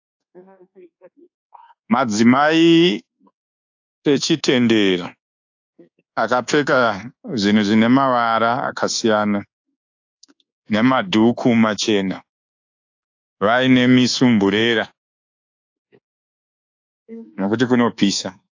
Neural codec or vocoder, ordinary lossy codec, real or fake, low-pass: codec, 24 kHz, 1.2 kbps, DualCodec; AAC, 48 kbps; fake; 7.2 kHz